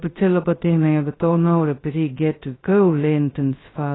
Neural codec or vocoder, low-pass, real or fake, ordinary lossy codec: codec, 16 kHz, 0.2 kbps, FocalCodec; 7.2 kHz; fake; AAC, 16 kbps